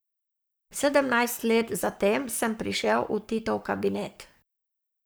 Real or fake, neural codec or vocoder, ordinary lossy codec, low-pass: fake; codec, 44.1 kHz, 7.8 kbps, Pupu-Codec; none; none